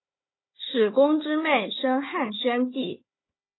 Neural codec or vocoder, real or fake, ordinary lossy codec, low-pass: codec, 16 kHz, 4 kbps, FunCodec, trained on Chinese and English, 50 frames a second; fake; AAC, 16 kbps; 7.2 kHz